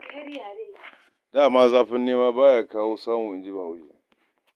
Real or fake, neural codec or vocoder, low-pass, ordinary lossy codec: fake; autoencoder, 48 kHz, 128 numbers a frame, DAC-VAE, trained on Japanese speech; 14.4 kHz; Opus, 24 kbps